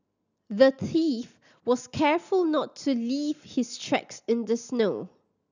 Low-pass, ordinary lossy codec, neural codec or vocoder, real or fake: 7.2 kHz; none; none; real